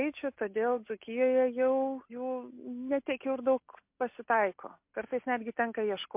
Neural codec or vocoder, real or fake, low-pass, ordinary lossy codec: none; real; 3.6 kHz; MP3, 32 kbps